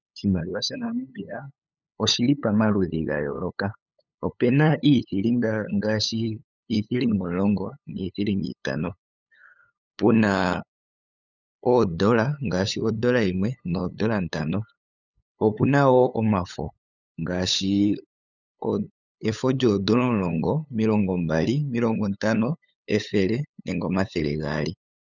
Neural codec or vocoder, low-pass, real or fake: codec, 16 kHz, 8 kbps, FunCodec, trained on LibriTTS, 25 frames a second; 7.2 kHz; fake